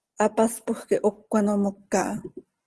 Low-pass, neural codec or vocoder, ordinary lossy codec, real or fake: 10.8 kHz; none; Opus, 16 kbps; real